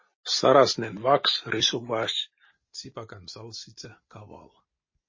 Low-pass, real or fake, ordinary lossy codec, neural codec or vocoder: 7.2 kHz; real; MP3, 32 kbps; none